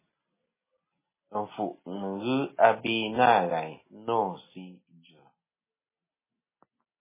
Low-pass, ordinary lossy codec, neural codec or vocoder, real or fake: 3.6 kHz; MP3, 16 kbps; none; real